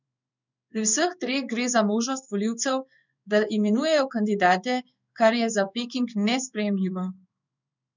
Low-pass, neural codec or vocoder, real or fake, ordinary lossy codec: 7.2 kHz; codec, 16 kHz in and 24 kHz out, 1 kbps, XY-Tokenizer; fake; none